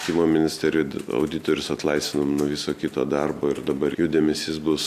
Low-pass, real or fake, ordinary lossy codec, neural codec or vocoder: 14.4 kHz; real; AAC, 96 kbps; none